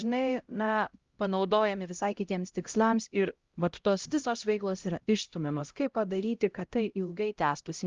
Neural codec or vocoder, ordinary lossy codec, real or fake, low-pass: codec, 16 kHz, 0.5 kbps, X-Codec, HuBERT features, trained on LibriSpeech; Opus, 32 kbps; fake; 7.2 kHz